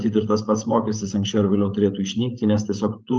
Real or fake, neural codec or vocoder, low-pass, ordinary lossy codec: fake; codec, 16 kHz, 16 kbps, FreqCodec, larger model; 7.2 kHz; Opus, 24 kbps